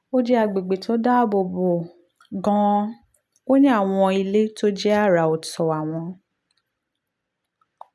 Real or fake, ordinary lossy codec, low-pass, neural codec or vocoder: real; none; none; none